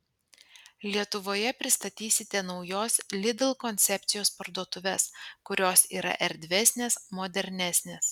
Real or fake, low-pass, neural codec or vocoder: real; 19.8 kHz; none